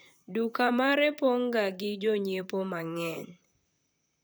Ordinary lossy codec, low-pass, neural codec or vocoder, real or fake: none; none; vocoder, 44.1 kHz, 128 mel bands, Pupu-Vocoder; fake